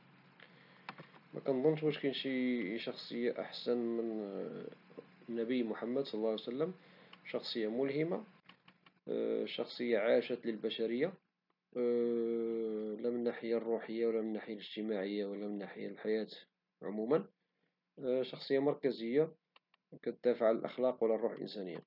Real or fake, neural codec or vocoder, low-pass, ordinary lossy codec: real; none; 5.4 kHz; none